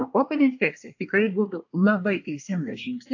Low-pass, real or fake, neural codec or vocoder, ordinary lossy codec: 7.2 kHz; fake; codec, 24 kHz, 1 kbps, SNAC; AAC, 48 kbps